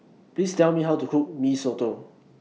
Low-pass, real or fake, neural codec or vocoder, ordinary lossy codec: none; real; none; none